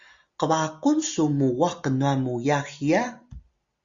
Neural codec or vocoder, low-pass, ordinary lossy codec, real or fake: none; 7.2 kHz; Opus, 64 kbps; real